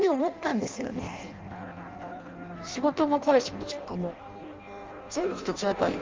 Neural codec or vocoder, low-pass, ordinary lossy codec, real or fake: codec, 16 kHz in and 24 kHz out, 0.6 kbps, FireRedTTS-2 codec; 7.2 kHz; Opus, 32 kbps; fake